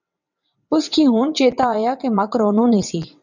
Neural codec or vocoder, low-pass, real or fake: vocoder, 22.05 kHz, 80 mel bands, WaveNeXt; 7.2 kHz; fake